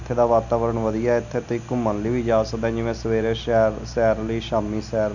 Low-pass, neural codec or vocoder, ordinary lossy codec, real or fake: 7.2 kHz; none; none; real